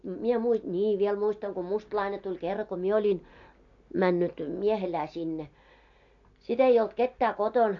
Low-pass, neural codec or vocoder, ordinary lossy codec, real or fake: 7.2 kHz; none; AAC, 48 kbps; real